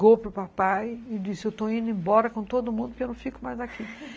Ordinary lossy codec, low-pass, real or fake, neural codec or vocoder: none; none; real; none